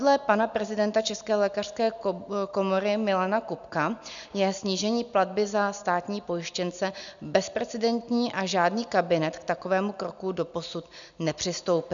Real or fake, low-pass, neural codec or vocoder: real; 7.2 kHz; none